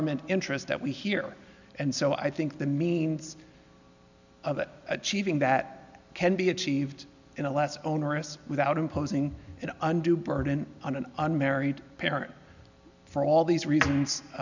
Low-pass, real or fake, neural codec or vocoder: 7.2 kHz; real; none